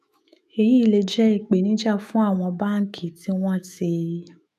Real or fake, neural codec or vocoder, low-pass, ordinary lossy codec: fake; autoencoder, 48 kHz, 128 numbers a frame, DAC-VAE, trained on Japanese speech; 14.4 kHz; none